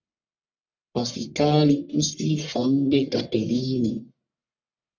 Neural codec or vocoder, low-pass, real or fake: codec, 44.1 kHz, 1.7 kbps, Pupu-Codec; 7.2 kHz; fake